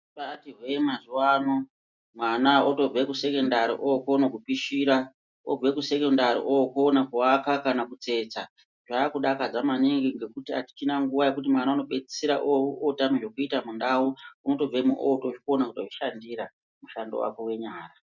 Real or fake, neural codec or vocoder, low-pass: real; none; 7.2 kHz